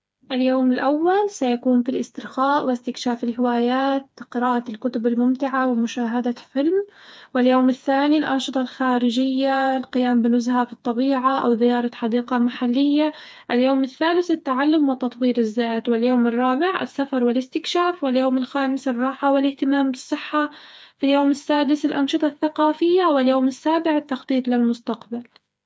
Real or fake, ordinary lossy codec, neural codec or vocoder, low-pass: fake; none; codec, 16 kHz, 4 kbps, FreqCodec, smaller model; none